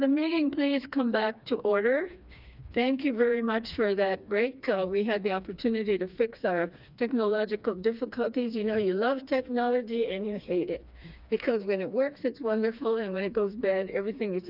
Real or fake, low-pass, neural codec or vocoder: fake; 5.4 kHz; codec, 16 kHz, 2 kbps, FreqCodec, smaller model